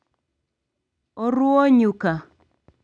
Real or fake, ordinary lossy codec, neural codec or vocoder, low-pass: real; none; none; 9.9 kHz